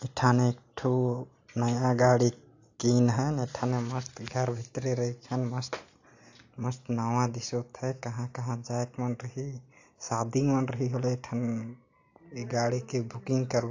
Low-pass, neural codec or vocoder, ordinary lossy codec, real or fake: 7.2 kHz; none; AAC, 48 kbps; real